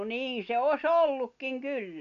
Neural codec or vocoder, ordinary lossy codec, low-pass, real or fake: none; none; 7.2 kHz; real